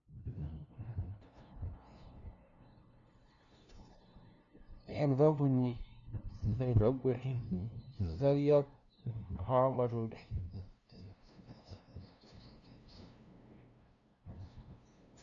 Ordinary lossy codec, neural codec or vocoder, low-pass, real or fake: none; codec, 16 kHz, 0.5 kbps, FunCodec, trained on LibriTTS, 25 frames a second; 7.2 kHz; fake